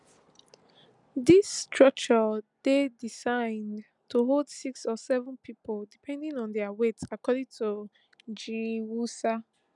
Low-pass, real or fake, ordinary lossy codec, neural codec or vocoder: 10.8 kHz; real; none; none